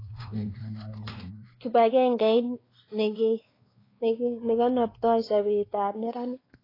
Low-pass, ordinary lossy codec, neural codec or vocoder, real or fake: 5.4 kHz; AAC, 24 kbps; codec, 16 kHz, 2 kbps, X-Codec, WavLM features, trained on Multilingual LibriSpeech; fake